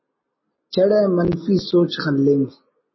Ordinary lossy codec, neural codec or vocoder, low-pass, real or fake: MP3, 24 kbps; none; 7.2 kHz; real